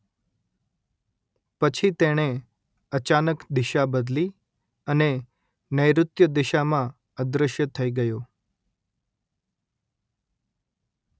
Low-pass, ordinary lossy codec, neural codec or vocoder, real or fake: none; none; none; real